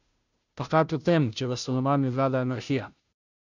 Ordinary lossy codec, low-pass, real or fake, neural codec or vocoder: none; 7.2 kHz; fake; codec, 16 kHz, 0.5 kbps, FunCodec, trained on Chinese and English, 25 frames a second